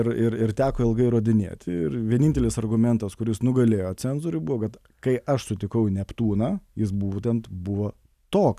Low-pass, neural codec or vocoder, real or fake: 14.4 kHz; none; real